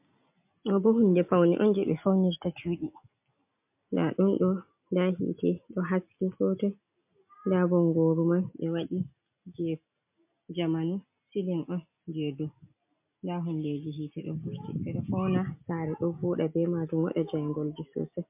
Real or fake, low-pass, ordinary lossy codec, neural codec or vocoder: real; 3.6 kHz; MP3, 32 kbps; none